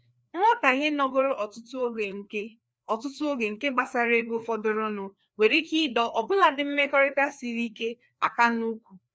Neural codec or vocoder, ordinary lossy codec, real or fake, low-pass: codec, 16 kHz, 2 kbps, FreqCodec, larger model; none; fake; none